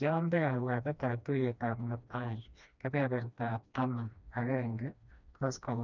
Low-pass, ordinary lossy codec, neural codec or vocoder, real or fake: 7.2 kHz; none; codec, 16 kHz, 1 kbps, FreqCodec, smaller model; fake